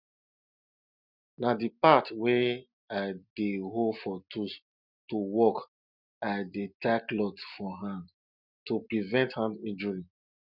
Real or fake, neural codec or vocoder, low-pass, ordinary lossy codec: real; none; 5.4 kHz; none